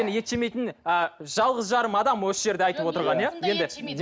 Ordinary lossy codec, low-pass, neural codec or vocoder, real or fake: none; none; none; real